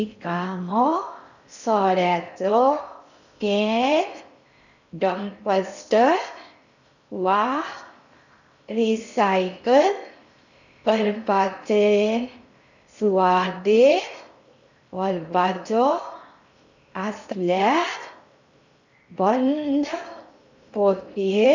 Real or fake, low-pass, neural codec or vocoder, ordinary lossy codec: fake; 7.2 kHz; codec, 16 kHz in and 24 kHz out, 0.6 kbps, FocalCodec, streaming, 4096 codes; none